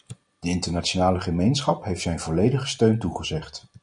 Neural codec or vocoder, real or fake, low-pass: none; real; 9.9 kHz